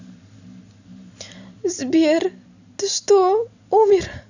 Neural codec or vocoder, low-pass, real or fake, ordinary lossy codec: none; 7.2 kHz; real; none